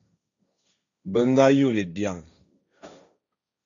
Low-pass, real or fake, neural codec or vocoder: 7.2 kHz; fake; codec, 16 kHz, 1.1 kbps, Voila-Tokenizer